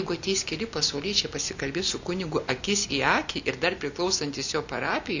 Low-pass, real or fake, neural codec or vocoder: 7.2 kHz; real; none